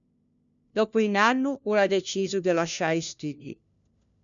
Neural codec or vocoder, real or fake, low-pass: codec, 16 kHz, 1 kbps, FunCodec, trained on LibriTTS, 50 frames a second; fake; 7.2 kHz